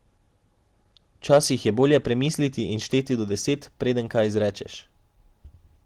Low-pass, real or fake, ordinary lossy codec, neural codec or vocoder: 19.8 kHz; real; Opus, 16 kbps; none